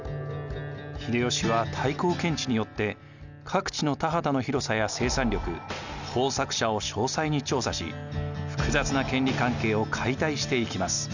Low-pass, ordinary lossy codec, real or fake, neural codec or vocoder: 7.2 kHz; none; real; none